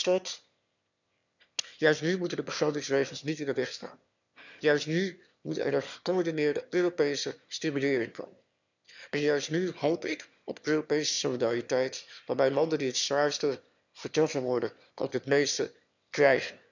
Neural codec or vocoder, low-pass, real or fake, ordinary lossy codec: autoencoder, 22.05 kHz, a latent of 192 numbers a frame, VITS, trained on one speaker; 7.2 kHz; fake; none